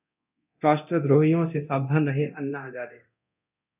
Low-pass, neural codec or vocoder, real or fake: 3.6 kHz; codec, 24 kHz, 0.9 kbps, DualCodec; fake